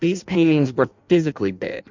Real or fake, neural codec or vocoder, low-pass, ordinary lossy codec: fake; codec, 16 kHz in and 24 kHz out, 0.6 kbps, FireRedTTS-2 codec; 7.2 kHz; MP3, 64 kbps